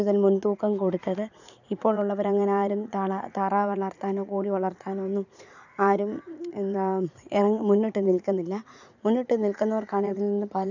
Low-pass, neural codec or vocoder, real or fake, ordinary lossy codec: 7.2 kHz; vocoder, 44.1 kHz, 80 mel bands, Vocos; fake; none